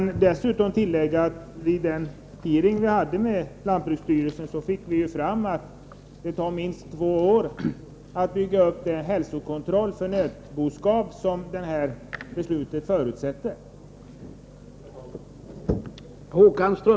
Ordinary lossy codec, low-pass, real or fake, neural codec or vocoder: none; none; real; none